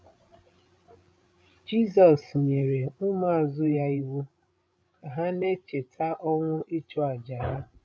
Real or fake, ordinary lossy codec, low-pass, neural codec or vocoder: fake; none; none; codec, 16 kHz, 16 kbps, FreqCodec, larger model